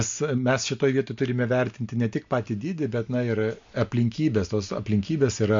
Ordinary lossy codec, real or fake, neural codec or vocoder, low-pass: MP3, 48 kbps; real; none; 7.2 kHz